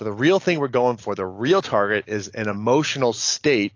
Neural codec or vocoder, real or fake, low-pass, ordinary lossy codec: none; real; 7.2 kHz; AAC, 48 kbps